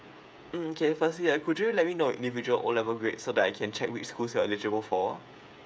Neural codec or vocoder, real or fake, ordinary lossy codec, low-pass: codec, 16 kHz, 16 kbps, FreqCodec, smaller model; fake; none; none